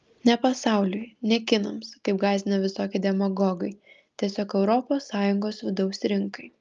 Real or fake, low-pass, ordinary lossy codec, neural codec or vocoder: real; 7.2 kHz; Opus, 24 kbps; none